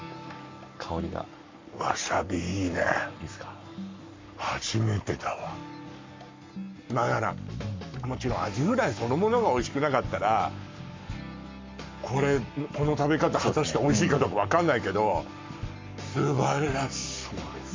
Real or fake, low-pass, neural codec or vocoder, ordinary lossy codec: fake; 7.2 kHz; codec, 44.1 kHz, 7.8 kbps, Pupu-Codec; MP3, 64 kbps